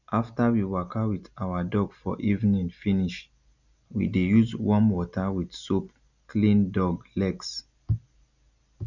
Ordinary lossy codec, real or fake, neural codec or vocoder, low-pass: none; real; none; 7.2 kHz